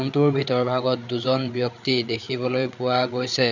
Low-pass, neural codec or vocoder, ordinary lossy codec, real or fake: 7.2 kHz; codec, 16 kHz, 8 kbps, FreqCodec, larger model; none; fake